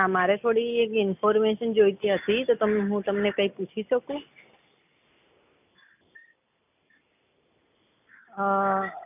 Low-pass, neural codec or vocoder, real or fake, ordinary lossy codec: 3.6 kHz; none; real; none